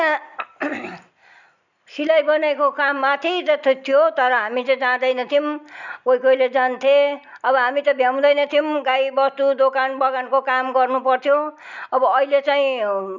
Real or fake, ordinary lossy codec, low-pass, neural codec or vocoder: real; none; 7.2 kHz; none